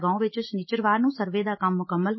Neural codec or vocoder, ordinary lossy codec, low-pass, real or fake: none; MP3, 24 kbps; 7.2 kHz; real